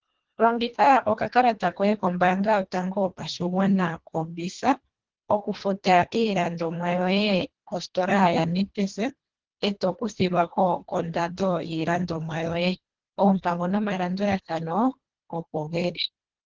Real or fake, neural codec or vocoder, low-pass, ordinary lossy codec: fake; codec, 24 kHz, 1.5 kbps, HILCodec; 7.2 kHz; Opus, 16 kbps